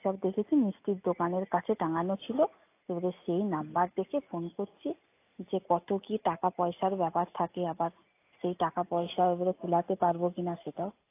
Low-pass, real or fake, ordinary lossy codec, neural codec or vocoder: 3.6 kHz; real; AAC, 24 kbps; none